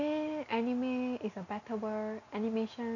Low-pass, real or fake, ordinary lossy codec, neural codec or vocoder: 7.2 kHz; real; none; none